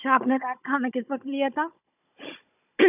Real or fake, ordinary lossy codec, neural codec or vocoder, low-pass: fake; none; codec, 16 kHz, 16 kbps, FunCodec, trained on Chinese and English, 50 frames a second; 3.6 kHz